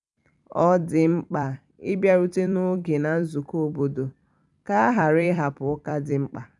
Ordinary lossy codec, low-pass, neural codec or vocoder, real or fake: none; 10.8 kHz; vocoder, 44.1 kHz, 128 mel bands every 256 samples, BigVGAN v2; fake